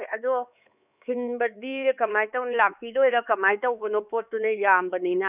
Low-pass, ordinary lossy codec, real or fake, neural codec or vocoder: 3.6 kHz; none; fake; codec, 16 kHz, 4 kbps, X-Codec, HuBERT features, trained on LibriSpeech